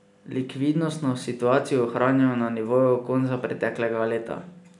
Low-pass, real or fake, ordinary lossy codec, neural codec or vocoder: 10.8 kHz; real; none; none